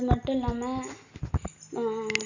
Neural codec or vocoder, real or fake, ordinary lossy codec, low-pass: none; real; none; 7.2 kHz